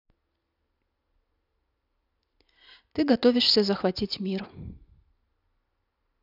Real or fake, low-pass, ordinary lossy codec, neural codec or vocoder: real; 5.4 kHz; none; none